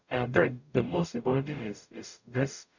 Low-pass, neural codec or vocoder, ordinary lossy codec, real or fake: 7.2 kHz; codec, 44.1 kHz, 0.9 kbps, DAC; none; fake